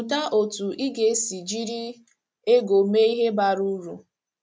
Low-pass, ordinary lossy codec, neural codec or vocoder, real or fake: none; none; none; real